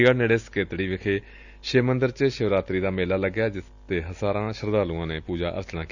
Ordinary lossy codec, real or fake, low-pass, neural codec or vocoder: none; real; 7.2 kHz; none